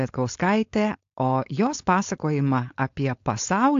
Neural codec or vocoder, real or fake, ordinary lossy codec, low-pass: codec, 16 kHz, 4.8 kbps, FACodec; fake; AAC, 48 kbps; 7.2 kHz